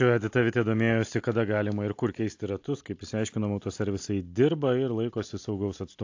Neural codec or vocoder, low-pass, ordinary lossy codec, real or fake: none; 7.2 kHz; AAC, 48 kbps; real